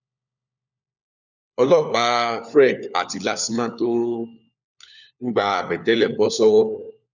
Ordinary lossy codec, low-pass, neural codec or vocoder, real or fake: none; 7.2 kHz; codec, 16 kHz, 4 kbps, FunCodec, trained on LibriTTS, 50 frames a second; fake